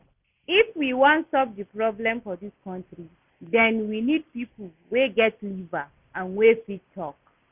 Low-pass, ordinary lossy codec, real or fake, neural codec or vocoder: 3.6 kHz; none; real; none